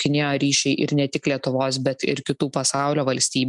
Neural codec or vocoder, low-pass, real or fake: none; 10.8 kHz; real